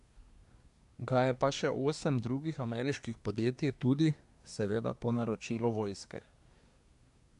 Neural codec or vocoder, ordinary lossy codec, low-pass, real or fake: codec, 24 kHz, 1 kbps, SNAC; none; 10.8 kHz; fake